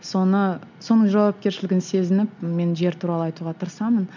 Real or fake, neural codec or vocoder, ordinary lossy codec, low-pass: real; none; none; 7.2 kHz